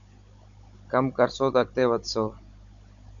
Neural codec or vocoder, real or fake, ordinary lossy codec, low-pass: codec, 16 kHz, 16 kbps, FunCodec, trained on Chinese and English, 50 frames a second; fake; AAC, 64 kbps; 7.2 kHz